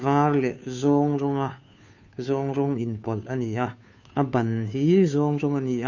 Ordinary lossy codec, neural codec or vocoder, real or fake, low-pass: none; codec, 16 kHz, 2 kbps, FunCodec, trained on Chinese and English, 25 frames a second; fake; 7.2 kHz